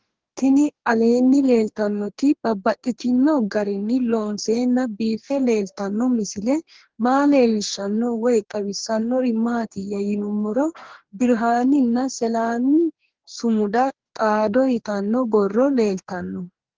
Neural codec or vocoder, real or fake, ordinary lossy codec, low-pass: codec, 44.1 kHz, 2.6 kbps, DAC; fake; Opus, 16 kbps; 7.2 kHz